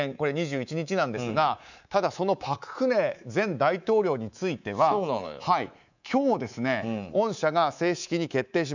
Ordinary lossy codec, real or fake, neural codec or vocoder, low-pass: none; fake; codec, 24 kHz, 3.1 kbps, DualCodec; 7.2 kHz